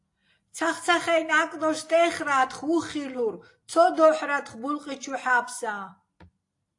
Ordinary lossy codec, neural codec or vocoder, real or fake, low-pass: AAC, 48 kbps; none; real; 10.8 kHz